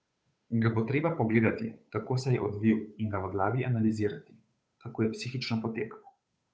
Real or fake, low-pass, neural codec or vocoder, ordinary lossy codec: fake; none; codec, 16 kHz, 8 kbps, FunCodec, trained on Chinese and English, 25 frames a second; none